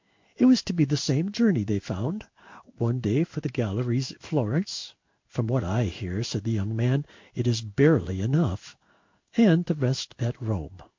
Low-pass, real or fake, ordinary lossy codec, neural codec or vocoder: 7.2 kHz; fake; MP3, 48 kbps; codec, 16 kHz in and 24 kHz out, 1 kbps, XY-Tokenizer